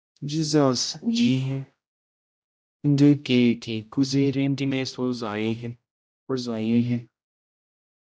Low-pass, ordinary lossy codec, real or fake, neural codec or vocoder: none; none; fake; codec, 16 kHz, 0.5 kbps, X-Codec, HuBERT features, trained on general audio